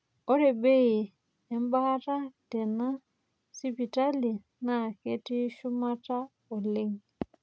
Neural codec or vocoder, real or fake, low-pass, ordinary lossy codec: none; real; none; none